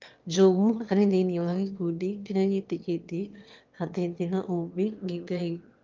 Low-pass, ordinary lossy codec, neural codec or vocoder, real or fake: 7.2 kHz; Opus, 32 kbps; autoencoder, 22.05 kHz, a latent of 192 numbers a frame, VITS, trained on one speaker; fake